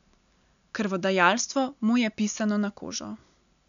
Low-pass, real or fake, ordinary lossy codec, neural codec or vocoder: 7.2 kHz; real; none; none